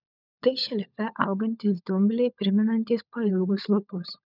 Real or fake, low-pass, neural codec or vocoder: fake; 5.4 kHz; codec, 16 kHz, 16 kbps, FunCodec, trained on LibriTTS, 50 frames a second